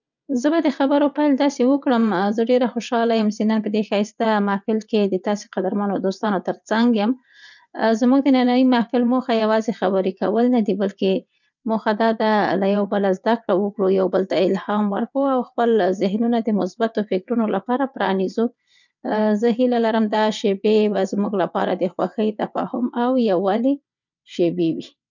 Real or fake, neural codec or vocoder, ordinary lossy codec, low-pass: fake; vocoder, 22.05 kHz, 80 mel bands, WaveNeXt; none; 7.2 kHz